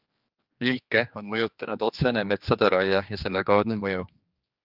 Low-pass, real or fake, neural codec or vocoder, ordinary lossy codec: 5.4 kHz; fake; codec, 16 kHz, 2 kbps, X-Codec, HuBERT features, trained on general audio; Opus, 24 kbps